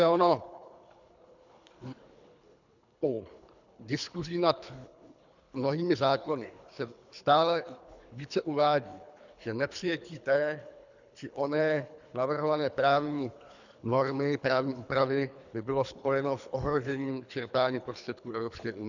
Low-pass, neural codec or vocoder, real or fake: 7.2 kHz; codec, 24 kHz, 3 kbps, HILCodec; fake